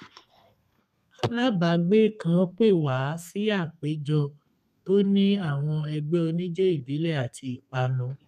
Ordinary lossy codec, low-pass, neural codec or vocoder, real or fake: none; 14.4 kHz; codec, 32 kHz, 1.9 kbps, SNAC; fake